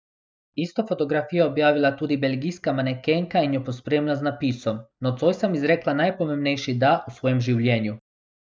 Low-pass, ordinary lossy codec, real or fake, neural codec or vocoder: none; none; real; none